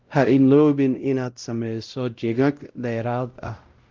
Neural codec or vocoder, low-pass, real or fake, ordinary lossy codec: codec, 16 kHz, 0.5 kbps, X-Codec, WavLM features, trained on Multilingual LibriSpeech; 7.2 kHz; fake; Opus, 32 kbps